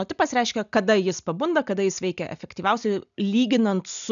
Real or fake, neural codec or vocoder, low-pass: real; none; 7.2 kHz